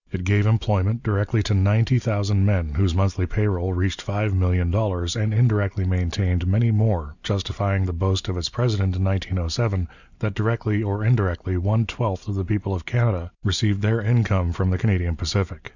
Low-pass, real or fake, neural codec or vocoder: 7.2 kHz; real; none